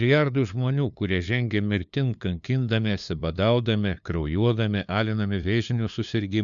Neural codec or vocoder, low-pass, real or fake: codec, 16 kHz, 2 kbps, FunCodec, trained on LibriTTS, 25 frames a second; 7.2 kHz; fake